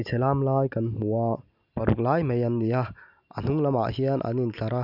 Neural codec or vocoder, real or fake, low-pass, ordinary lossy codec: none; real; 5.4 kHz; none